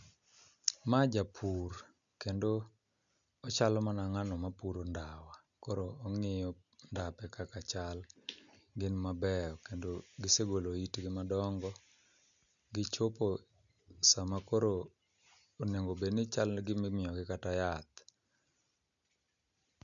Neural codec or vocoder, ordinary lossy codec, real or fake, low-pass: none; none; real; 7.2 kHz